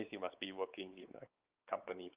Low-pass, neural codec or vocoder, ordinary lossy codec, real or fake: 3.6 kHz; codec, 16 kHz, 4 kbps, X-Codec, WavLM features, trained on Multilingual LibriSpeech; Opus, 32 kbps; fake